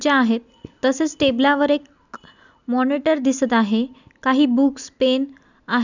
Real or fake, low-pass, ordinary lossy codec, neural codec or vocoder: real; 7.2 kHz; none; none